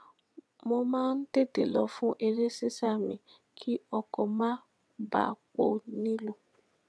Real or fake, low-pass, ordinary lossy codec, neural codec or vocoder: fake; none; none; vocoder, 22.05 kHz, 80 mel bands, WaveNeXt